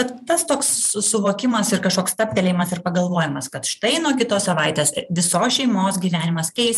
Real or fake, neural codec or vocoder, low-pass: fake; vocoder, 44.1 kHz, 128 mel bands every 512 samples, BigVGAN v2; 14.4 kHz